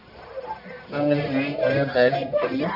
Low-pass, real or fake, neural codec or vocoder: 5.4 kHz; fake; codec, 44.1 kHz, 1.7 kbps, Pupu-Codec